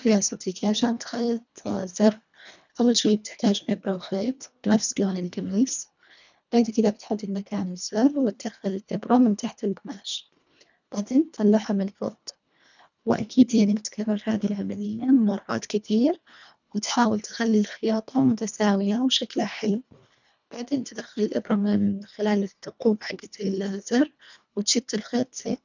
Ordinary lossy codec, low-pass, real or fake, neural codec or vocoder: none; 7.2 kHz; fake; codec, 24 kHz, 1.5 kbps, HILCodec